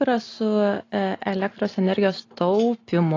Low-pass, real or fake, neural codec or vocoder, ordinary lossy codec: 7.2 kHz; real; none; AAC, 32 kbps